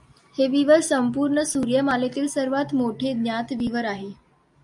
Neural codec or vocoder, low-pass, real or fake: none; 10.8 kHz; real